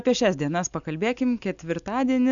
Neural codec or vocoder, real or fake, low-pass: none; real; 7.2 kHz